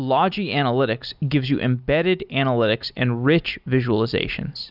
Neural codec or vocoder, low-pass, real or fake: none; 5.4 kHz; real